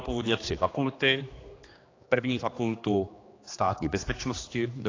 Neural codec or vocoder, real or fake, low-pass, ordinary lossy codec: codec, 16 kHz, 2 kbps, X-Codec, HuBERT features, trained on general audio; fake; 7.2 kHz; AAC, 32 kbps